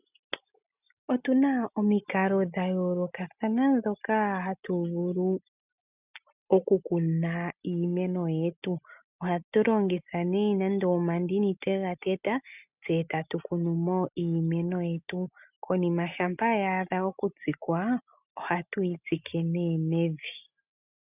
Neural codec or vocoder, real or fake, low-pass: none; real; 3.6 kHz